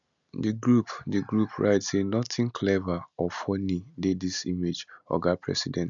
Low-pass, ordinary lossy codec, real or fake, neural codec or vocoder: 7.2 kHz; none; real; none